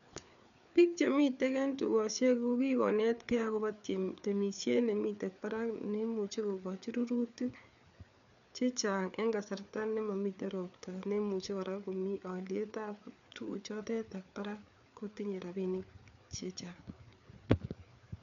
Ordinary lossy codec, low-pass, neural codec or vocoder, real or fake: none; 7.2 kHz; codec, 16 kHz, 4 kbps, FreqCodec, larger model; fake